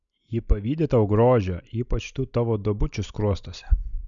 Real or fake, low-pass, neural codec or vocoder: real; 7.2 kHz; none